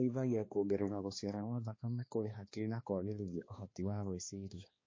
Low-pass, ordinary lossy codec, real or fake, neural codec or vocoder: 7.2 kHz; MP3, 32 kbps; fake; codec, 16 kHz, 2 kbps, X-Codec, HuBERT features, trained on balanced general audio